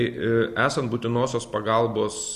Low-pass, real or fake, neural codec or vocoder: 14.4 kHz; real; none